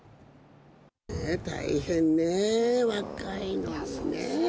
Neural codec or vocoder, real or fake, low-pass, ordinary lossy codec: none; real; none; none